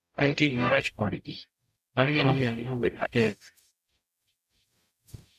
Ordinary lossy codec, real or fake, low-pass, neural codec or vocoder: none; fake; 14.4 kHz; codec, 44.1 kHz, 0.9 kbps, DAC